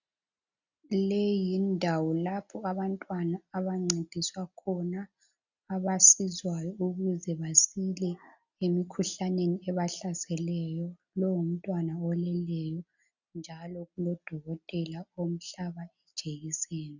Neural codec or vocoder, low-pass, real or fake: none; 7.2 kHz; real